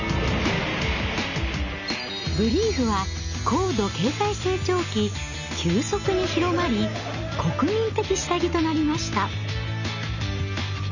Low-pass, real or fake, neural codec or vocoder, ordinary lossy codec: 7.2 kHz; real; none; none